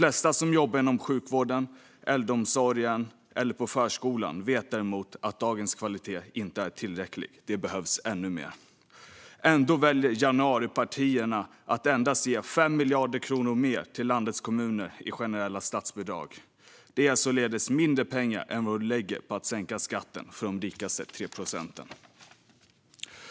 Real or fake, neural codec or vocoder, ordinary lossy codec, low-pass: real; none; none; none